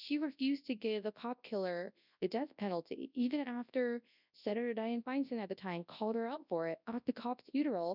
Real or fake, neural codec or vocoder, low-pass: fake; codec, 24 kHz, 0.9 kbps, WavTokenizer, large speech release; 5.4 kHz